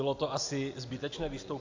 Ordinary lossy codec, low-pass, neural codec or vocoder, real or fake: AAC, 48 kbps; 7.2 kHz; none; real